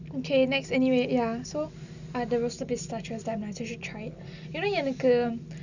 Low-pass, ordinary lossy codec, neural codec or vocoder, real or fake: 7.2 kHz; none; none; real